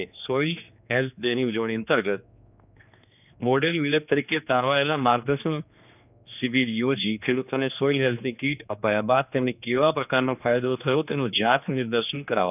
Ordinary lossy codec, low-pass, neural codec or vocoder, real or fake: none; 3.6 kHz; codec, 16 kHz, 2 kbps, X-Codec, HuBERT features, trained on general audio; fake